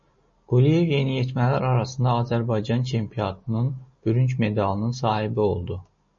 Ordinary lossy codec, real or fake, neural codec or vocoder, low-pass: MP3, 32 kbps; real; none; 7.2 kHz